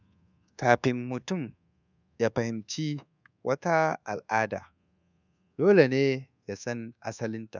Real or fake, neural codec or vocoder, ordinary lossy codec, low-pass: fake; codec, 24 kHz, 1.2 kbps, DualCodec; none; 7.2 kHz